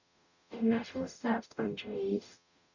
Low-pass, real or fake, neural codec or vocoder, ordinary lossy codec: 7.2 kHz; fake; codec, 44.1 kHz, 0.9 kbps, DAC; none